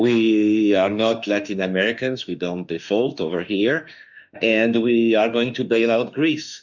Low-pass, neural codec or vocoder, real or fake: 7.2 kHz; autoencoder, 48 kHz, 32 numbers a frame, DAC-VAE, trained on Japanese speech; fake